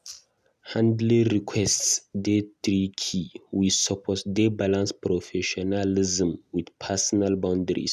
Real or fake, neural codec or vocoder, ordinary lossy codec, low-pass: real; none; none; 14.4 kHz